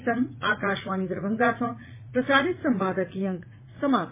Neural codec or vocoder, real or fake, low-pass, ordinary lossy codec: vocoder, 44.1 kHz, 80 mel bands, Vocos; fake; 3.6 kHz; MP3, 16 kbps